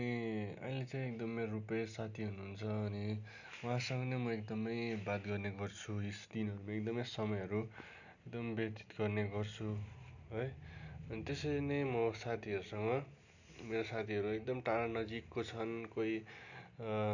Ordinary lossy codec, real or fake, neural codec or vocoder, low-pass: none; real; none; 7.2 kHz